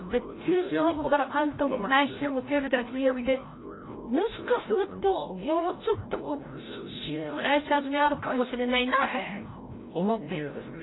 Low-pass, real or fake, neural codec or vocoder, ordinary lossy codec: 7.2 kHz; fake; codec, 16 kHz, 0.5 kbps, FreqCodec, larger model; AAC, 16 kbps